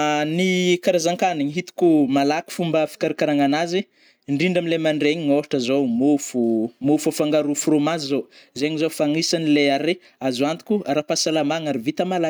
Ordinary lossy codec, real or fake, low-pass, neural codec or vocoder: none; real; none; none